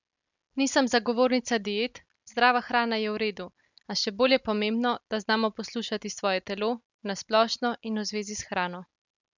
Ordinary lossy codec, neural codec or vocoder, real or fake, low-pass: none; none; real; 7.2 kHz